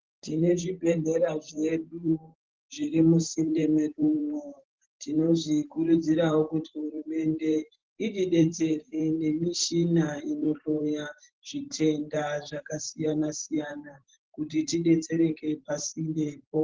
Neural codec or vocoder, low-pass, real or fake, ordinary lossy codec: vocoder, 44.1 kHz, 128 mel bands every 512 samples, BigVGAN v2; 7.2 kHz; fake; Opus, 16 kbps